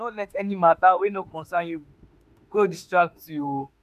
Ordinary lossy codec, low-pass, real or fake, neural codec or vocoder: none; 14.4 kHz; fake; autoencoder, 48 kHz, 32 numbers a frame, DAC-VAE, trained on Japanese speech